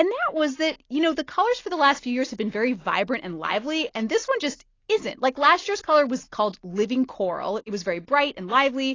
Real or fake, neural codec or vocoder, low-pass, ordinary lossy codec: real; none; 7.2 kHz; AAC, 32 kbps